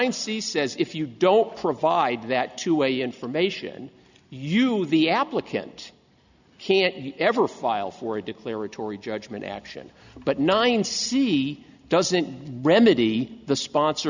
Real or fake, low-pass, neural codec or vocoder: real; 7.2 kHz; none